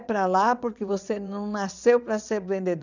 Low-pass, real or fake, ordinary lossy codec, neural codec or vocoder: 7.2 kHz; fake; none; vocoder, 22.05 kHz, 80 mel bands, WaveNeXt